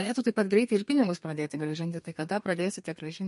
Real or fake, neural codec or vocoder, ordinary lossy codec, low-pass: fake; codec, 32 kHz, 1.9 kbps, SNAC; MP3, 48 kbps; 14.4 kHz